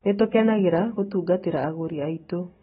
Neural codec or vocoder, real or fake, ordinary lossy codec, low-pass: none; real; AAC, 16 kbps; 19.8 kHz